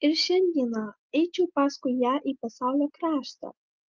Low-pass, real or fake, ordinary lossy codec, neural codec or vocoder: 7.2 kHz; real; Opus, 32 kbps; none